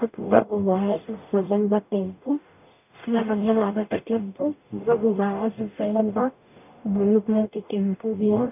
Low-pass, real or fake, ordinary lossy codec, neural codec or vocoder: 3.6 kHz; fake; none; codec, 44.1 kHz, 0.9 kbps, DAC